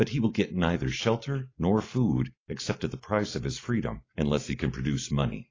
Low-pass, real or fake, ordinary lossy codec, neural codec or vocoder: 7.2 kHz; real; AAC, 32 kbps; none